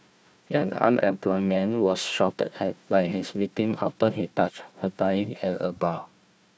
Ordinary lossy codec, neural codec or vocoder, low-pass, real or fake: none; codec, 16 kHz, 1 kbps, FunCodec, trained on Chinese and English, 50 frames a second; none; fake